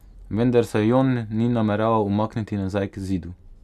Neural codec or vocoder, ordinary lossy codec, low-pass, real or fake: none; none; 14.4 kHz; real